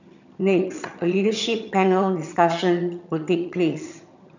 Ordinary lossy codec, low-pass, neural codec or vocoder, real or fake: none; 7.2 kHz; vocoder, 22.05 kHz, 80 mel bands, HiFi-GAN; fake